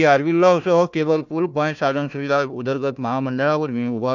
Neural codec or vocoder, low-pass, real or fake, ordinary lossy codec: codec, 16 kHz, 1 kbps, FunCodec, trained on Chinese and English, 50 frames a second; 7.2 kHz; fake; none